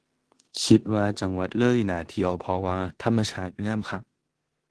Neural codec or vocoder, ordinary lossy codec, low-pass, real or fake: codec, 16 kHz in and 24 kHz out, 0.9 kbps, LongCat-Audio-Codec, four codebook decoder; Opus, 16 kbps; 10.8 kHz; fake